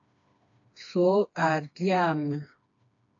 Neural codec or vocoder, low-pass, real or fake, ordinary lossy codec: codec, 16 kHz, 2 kbps, FreqCodec, smaller model; 7.2 kHz; fake; AAC, 48 kbps